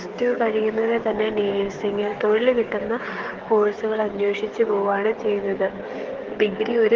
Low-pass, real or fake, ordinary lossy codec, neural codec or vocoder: 7.2 kHz; fake; Opus, 16 kbps; codec, 16 kHz, 16 kbps, FreqCodec, smaller model